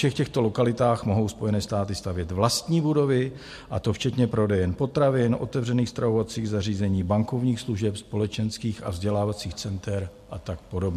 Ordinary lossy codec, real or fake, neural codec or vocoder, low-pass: MP3, 64 kbps; real; none; 14.4 kHz